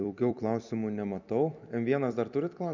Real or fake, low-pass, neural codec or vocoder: fake; 7.2 kHz; vocoder, 44.1 kHz, 128 mel bands every 256 samples, BigVGAN v2